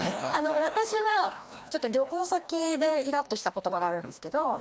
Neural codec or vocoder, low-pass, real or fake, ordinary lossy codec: codec, 16 kHz, 1 kbps, FreqCodec, larger model; none; fake; none